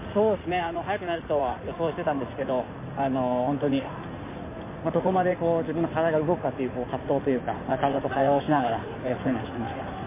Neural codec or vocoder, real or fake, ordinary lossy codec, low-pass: codec, 16 kHz in and 24 kHz out, 2.2 kbps, FireRedTTS-2 codec; fake; MP3, 24 kbps; 3.6 kHz